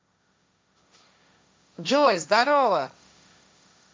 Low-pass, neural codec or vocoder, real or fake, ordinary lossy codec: none; codec, 16 kHz, 1.1 kbps, Voila-Tokenizer; fake; none